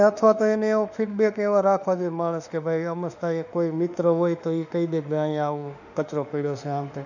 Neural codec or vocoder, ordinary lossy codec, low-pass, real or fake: autoencoder, 48 kHz, 32 numbers a frame, DAC-VAE, trained on Japanese speech; none; 7.2 kHz; fake